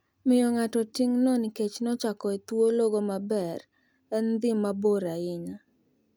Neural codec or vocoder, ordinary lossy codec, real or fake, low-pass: none; none; real; none